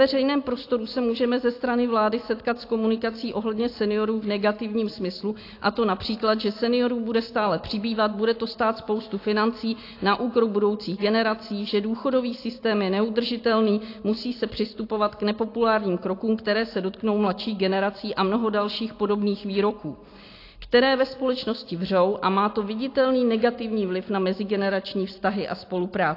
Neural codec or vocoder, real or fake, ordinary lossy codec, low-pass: none; real; AAC, 32 kbps; 5.4 kHz